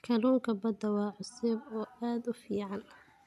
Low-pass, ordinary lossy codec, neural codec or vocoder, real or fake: 14.4 kHz; none; none; real